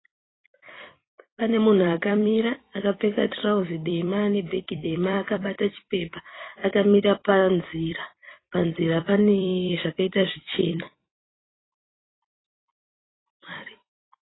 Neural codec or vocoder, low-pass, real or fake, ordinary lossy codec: vocoder, 44.1 kHz, 128 mel bands every 256 samples, BigVGAN v2; 7.2 kHz; fake; AAC, 16 kbps